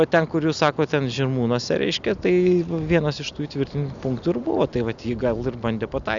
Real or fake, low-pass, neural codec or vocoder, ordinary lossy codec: real; 7.2 kHz; none; Opus, 64 kbps